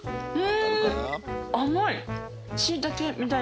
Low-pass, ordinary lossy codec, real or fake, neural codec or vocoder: none; none; real; none